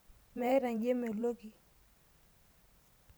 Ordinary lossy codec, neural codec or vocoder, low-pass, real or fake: none; vocoder, 44.1 kHz, 128 mel bands every 512 samples, BigVGAN v2; none; fake